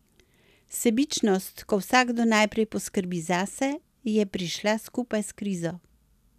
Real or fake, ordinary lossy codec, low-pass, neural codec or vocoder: real; none; 14.4 kHz; none